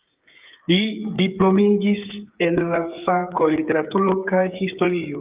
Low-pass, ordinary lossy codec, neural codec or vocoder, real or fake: 3.6 kHz; Opus, 24 kbps; codec, 16 kHz, 8 kbps, FreqCodec, smaller model; fake